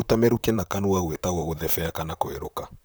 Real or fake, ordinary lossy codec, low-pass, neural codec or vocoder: fake; none; none; vocoder, 44.1 kHz, 128 mel bands, Pupu-Vocoder